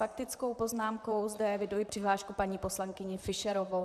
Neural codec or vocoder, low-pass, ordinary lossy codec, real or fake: vocoder, 44.1 kHz, 128 mel bands, Pupu-Vocoder; 14.4 kHz; AAC, 96 kbps; fake